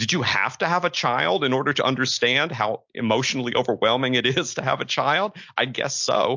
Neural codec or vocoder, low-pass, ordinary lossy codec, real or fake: none; 7.2 kHz; MP3, 48 kbps; real